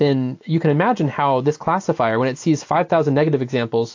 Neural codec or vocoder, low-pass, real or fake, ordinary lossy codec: none; 7.2 kHz; real; AAC, 48 kbps